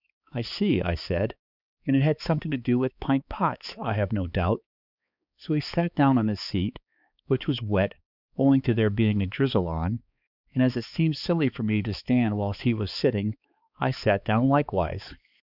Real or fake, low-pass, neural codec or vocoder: fake; 5.4 kHz; codec, 16 kHz, 4 kbps, X-Codec, HuBERT features, trained on balanced general audio